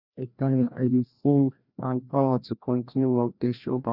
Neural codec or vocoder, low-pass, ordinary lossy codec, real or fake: codec, 16 kHz, 1 kbps, FreqCodec, larger model; 5.4 kHz; none; fake